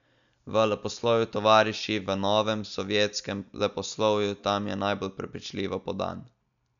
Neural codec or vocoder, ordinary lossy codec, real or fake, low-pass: none; none; real; 7.2 kHz